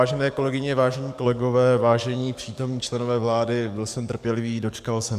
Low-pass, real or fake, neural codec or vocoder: 14.4 kHz; fake; codec, 44.1 kHz, 7.8 kbps, DAC